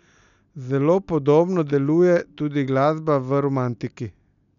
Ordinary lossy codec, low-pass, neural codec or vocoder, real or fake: none; 7.2 kHz; none; real